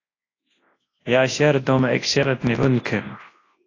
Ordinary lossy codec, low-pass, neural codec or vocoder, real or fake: AAC, 32 kbps; 7.2 kHz; codec, 24 kHz, 0.9 kbps, WavTokenizer, large speech release; fake